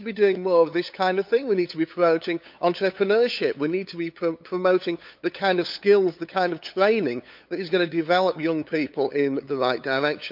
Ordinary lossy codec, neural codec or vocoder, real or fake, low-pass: MP3, 48 kbps; codec, 16 kHz, 8 kbps, FunCodec, trained on LibriTTS, 25 frames a second; fake; 5.4 kHz